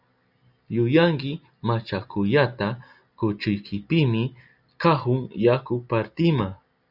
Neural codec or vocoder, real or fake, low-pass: none; real; 5.4 kHz